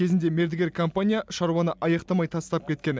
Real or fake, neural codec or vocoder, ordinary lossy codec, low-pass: real; none; none; none